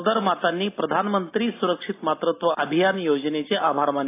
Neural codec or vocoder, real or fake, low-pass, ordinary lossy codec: none; real; 3.6 kHz; AAC, 24 kbps